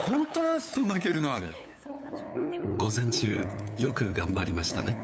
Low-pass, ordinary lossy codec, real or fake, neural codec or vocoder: none; none; fake; codec, 16 kHz, 8 kbps, FunCodec, trained on LibriTTS, 25 frames a second